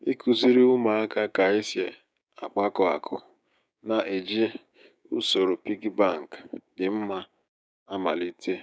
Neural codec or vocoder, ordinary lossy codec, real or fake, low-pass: codec, 16 kHz, 6 kbps, DAC; none; fake; none